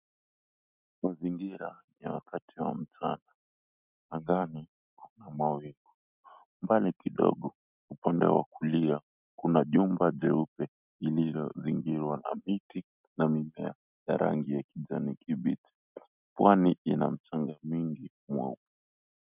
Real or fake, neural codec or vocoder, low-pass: real; none; 3.6 kHz